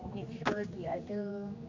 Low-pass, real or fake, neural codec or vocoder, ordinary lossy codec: 7.2 kHz; fake; codec, 16 kHz, 2 kbps, X-Codec, HuBERT features, trained on general audio; AAC, 48 kbps